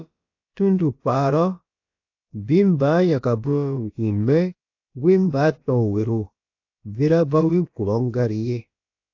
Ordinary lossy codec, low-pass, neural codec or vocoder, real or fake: AAC, 48 kbps; 7.2 kHz; codec, 16 kHz, about 1 kbps, DyCAST, with the encoder's durations; fake